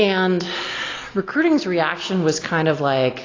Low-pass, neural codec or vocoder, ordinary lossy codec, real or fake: 7.2 kHz; none; AAC, 32 kbps; real